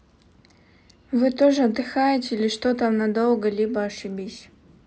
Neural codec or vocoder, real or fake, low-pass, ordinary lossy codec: none; real; none; none